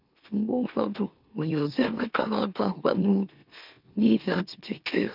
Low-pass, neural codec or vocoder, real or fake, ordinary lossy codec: 5.4 kHz; autoencoder, 44.1 kHz, a latent of 192 numbers a frame, MeloTTS; fake; none